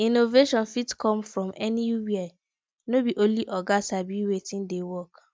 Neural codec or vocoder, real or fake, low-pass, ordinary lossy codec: none; real; none; none